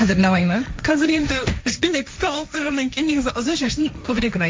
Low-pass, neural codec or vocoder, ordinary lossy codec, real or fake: none; codec, 16 kHz, 1.1 kbps, Voila-Tokenizer; none; fake